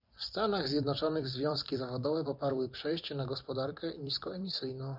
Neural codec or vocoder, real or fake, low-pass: vocoder, 44.1 kHz, 128 mel bands every 256 samples, BigVGAN v2; fake; 5.4 kHz